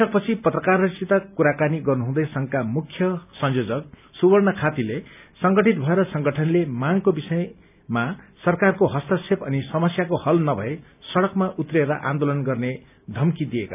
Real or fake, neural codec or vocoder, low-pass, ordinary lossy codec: real; none; 3.6 kHz; none